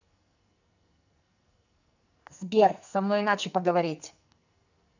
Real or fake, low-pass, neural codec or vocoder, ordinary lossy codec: fake; 7.2 kHz; codec, 44.1 kHz, 2.6 kbps, SNAC; none